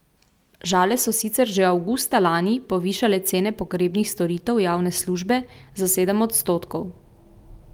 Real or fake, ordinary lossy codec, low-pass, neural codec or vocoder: real; Opus, 32 kbps; 19.8 kHz; none